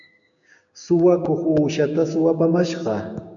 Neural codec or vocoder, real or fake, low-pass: codec, 16 kHz, 6 kbps, DAC; fake; 7.2 kHz